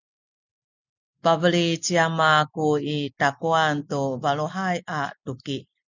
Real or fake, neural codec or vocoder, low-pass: real; none; 7.2 kHz